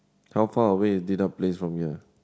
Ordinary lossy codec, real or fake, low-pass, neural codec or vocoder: none; real; none; none